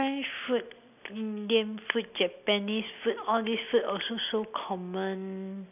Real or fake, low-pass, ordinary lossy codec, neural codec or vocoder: real; 3.6 kHz; none; none